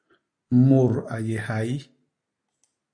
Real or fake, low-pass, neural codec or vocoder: real; 9.9 kHz; none